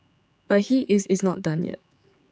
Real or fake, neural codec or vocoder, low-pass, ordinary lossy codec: fake; codec, 16 kHz, 4 kbps, X-Codec, HuBERT features, trained on general audio; none; none